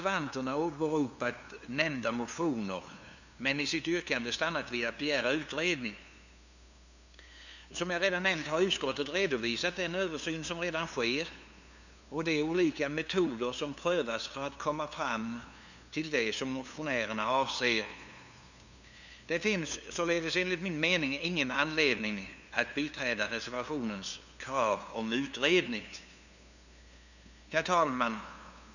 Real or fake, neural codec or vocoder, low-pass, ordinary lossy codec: fake; codec, 16 kHz, 2 kbps, FunCodec, trained on LibriTTS, 25 frames a second; 7.2 kHz; none